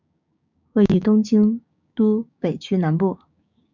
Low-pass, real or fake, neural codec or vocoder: 7.2 kHz; fake; codec, 16 kHz, 6 kbps, DAC